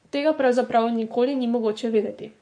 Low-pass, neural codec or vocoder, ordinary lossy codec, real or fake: 9.9 kHz; codec, 44.1 kHz, 7.8 kbps, Pupu-Codec; MP3, 48 kbps; fake